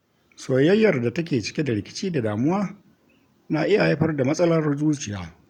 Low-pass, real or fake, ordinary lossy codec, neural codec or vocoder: 19.8 kHz; real; none; none